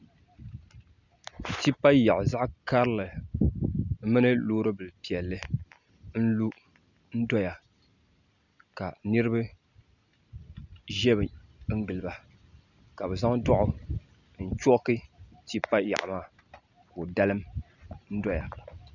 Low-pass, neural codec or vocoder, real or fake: 7.2 kHz; none; real